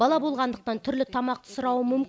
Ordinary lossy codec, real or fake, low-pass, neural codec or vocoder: none; real; none; none